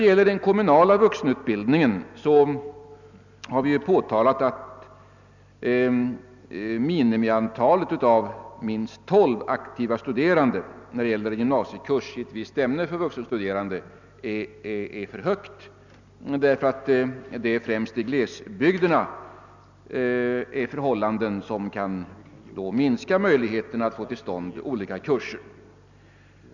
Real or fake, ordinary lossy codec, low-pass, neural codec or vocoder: real; none; 7.2 kHz; none